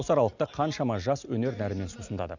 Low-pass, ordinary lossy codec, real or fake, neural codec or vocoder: 7.2 kHz; none; real; none